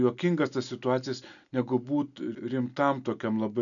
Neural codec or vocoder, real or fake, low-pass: none; real; 7.2 kHz